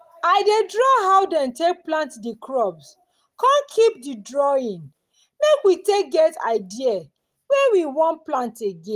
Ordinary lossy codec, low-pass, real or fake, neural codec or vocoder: Opus, 24 kbps; 14.4 kHz; real; none